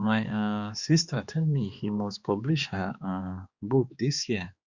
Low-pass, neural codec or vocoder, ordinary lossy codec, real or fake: 7.2 kHz; codec, 16 kHz, 2 kbps, X-Codec, HuBERT features, trained on balanced general audio; Opus, 64 kbps; fake